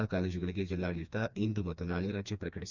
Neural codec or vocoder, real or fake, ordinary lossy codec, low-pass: codec, 16 kHz, 2 kbps, FreqCodec, smaller model; fake; none; 7.2 kHz